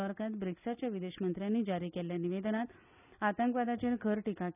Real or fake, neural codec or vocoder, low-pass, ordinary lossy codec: real; none; 3.6 kHz; none